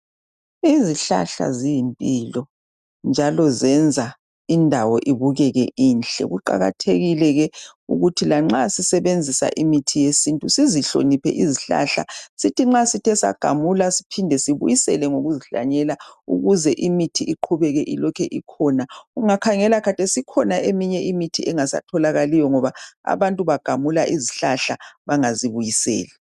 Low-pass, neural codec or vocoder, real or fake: 14.4 kHz; none; real